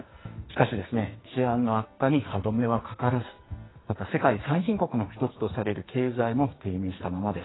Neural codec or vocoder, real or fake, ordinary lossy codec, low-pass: codec, 44.1 kHz, 2.6 kbps, SNAC; fake; AAC, 16 kbps; 7.2 kHz